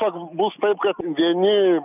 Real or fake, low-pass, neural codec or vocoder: real; 3.6 kHz; none